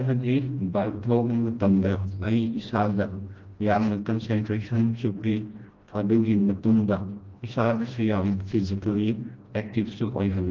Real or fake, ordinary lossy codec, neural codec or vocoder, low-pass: fake; Opus, 32 kbps; codec, 16 kHz, 1 kbps, FreqCodec, smaller model; 7.2 kHz